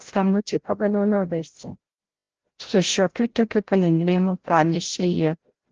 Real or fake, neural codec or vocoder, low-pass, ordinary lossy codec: fake; codec, 16 kHz, 0.5 kbps, FreqCodec, larger model; 7.2 kHz; Opus, 16 kbps